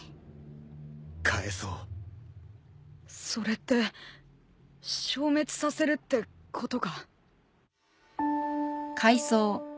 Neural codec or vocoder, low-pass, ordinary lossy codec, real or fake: none; none; none; real